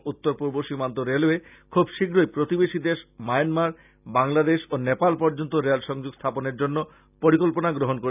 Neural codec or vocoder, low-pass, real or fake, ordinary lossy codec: none; 3.6 kHz; real; none